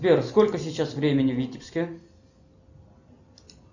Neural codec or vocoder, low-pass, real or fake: none; 7.2 kHz; real